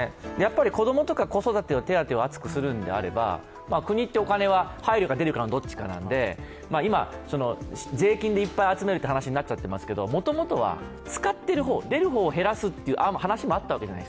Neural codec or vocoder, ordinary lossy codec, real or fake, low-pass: none; none; real; none